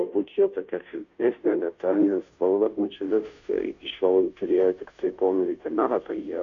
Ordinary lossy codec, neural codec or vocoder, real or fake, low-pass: MP3, 96 kbps; codec, 16 kHz, 0.5 kbps, FunCodec, trained on Chinese and English, 25 frames a second; fake; 7.2 kHz